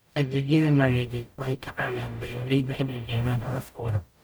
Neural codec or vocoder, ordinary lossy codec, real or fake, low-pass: codec, 44.1 kHz, 0.9 kbps, DAC; none; fake; none